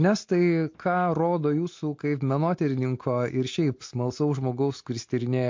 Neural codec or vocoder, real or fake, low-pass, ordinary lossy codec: none; real; 7.2 kHz; MP3, 48 kbps